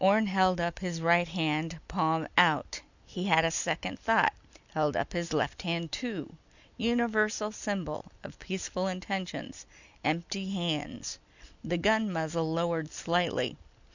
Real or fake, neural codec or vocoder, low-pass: real; none; 7.2 kHz